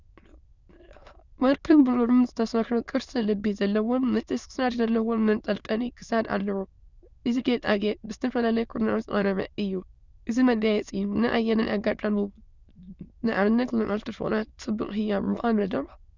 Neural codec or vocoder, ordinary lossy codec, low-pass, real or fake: autoencoder, 22.05 kHz, a latent of 192 numbers a frame, VITS, trained on many speakers; Opus, 64 kbps; 7.2 kHz; fake